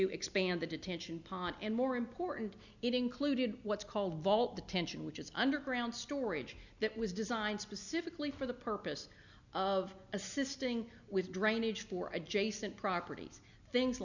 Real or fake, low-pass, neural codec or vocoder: real; 7.2 kHz; none